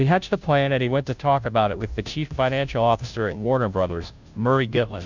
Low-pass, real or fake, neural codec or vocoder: 7.2 kHz; fake; codec, 16 kHz, 0.5 kbps, FunCodec, trained on Chinese and English, 25 frames a second